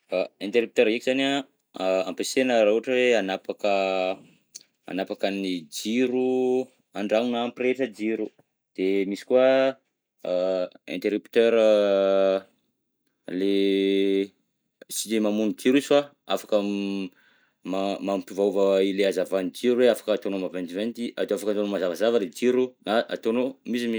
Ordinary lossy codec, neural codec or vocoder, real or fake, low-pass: none; none; real; none